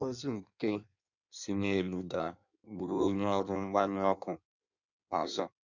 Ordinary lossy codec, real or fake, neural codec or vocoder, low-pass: none; fake; codec, 16 kHz in and 24 kHz out, 1.1 kbps, FireRedTTS-2 codec; 7.2 kHz